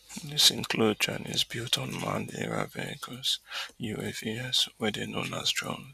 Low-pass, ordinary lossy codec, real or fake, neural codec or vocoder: 14.4 kHz; none; fake; vocoder, 44.1 kHz, 128 mel bands every 256 samples, BigVGAN v2